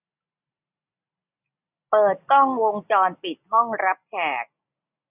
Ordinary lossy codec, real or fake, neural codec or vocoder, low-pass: none; real; none; 3.6 kHz